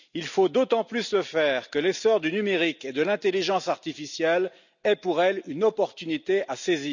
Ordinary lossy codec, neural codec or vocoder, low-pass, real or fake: none; none; 7.2 kHz; real